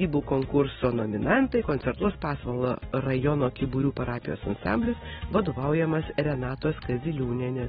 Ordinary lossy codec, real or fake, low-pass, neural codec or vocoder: AAC, 16 kbps; real; 19.8 kHz; none